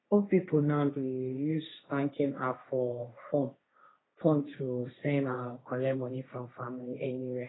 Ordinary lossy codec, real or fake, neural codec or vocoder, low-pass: AAC, 16 kbps; fake; codec, 16 kHz, 1.1 kbps, Voila-Tokenizer; 7.2 kHz